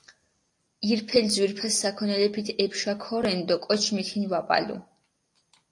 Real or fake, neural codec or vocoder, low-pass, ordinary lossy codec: real; none; 10.8 kHz; AAC, 48 kbps